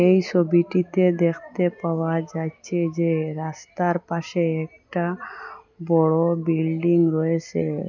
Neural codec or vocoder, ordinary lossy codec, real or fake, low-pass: none; none; real; 7.2 kHz